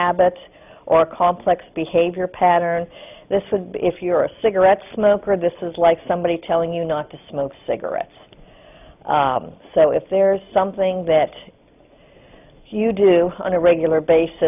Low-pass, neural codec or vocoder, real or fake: 3.6 kHz; none; real